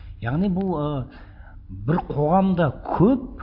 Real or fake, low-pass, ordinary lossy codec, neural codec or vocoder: real; 5.4 kHz; AAC, 48 kbps; none